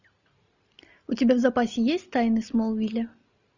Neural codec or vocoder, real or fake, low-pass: none; real; 7.2 kHz